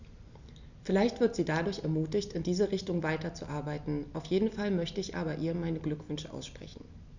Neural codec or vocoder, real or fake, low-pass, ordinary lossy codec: none; real; 7.2 kHz; none